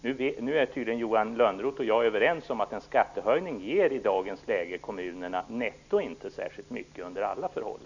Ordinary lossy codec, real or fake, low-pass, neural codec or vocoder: none; real; 7.2 kHz; none